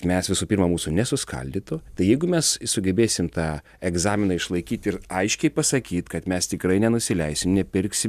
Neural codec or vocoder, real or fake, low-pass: none; real; 14.4 kHz